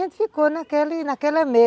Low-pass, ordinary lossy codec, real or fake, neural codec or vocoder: none; none; real; none